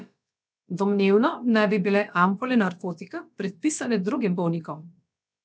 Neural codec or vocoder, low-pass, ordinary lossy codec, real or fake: codec, 16 kHz, about 1 kbps, DyCAST, with the encoder's durations; none; none; fake